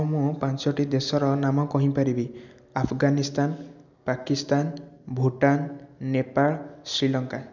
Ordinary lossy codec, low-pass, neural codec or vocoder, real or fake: none; 7.2 kHz; none; real